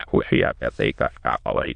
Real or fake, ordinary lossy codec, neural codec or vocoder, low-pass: fake; MP3, 64 kbps; autoencoder, 22.05 kHz, a latent of 192 numbers a frame, VITS, trained on many speakers; 9.9 kHz